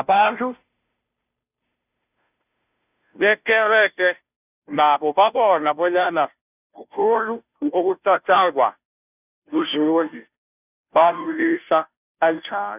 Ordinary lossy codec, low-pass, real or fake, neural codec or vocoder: none; 3.6 kHz; fake; codec, 16 kHz, 0.5 kbps, FunCodec, trained on Chinese and English, 25 frames a second